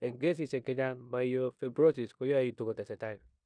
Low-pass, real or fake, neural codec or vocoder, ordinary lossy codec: 9.9 kHz; fake; codec, 16 kHz in and 24 kHz out, 0.9 kbps, LongCat-Audio-Codec, four codebook decoder; none